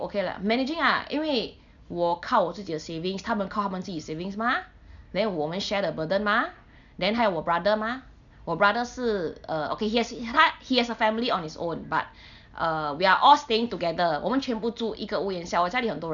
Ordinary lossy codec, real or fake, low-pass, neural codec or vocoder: none; real; 7.2 kHz; none